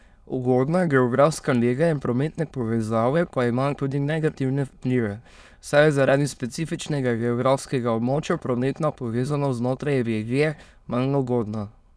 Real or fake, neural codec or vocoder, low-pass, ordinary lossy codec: fake; autoencoder, 22.05 kHz, a latent of 192 numbers a frame, VITS, trained on many speakers; none; none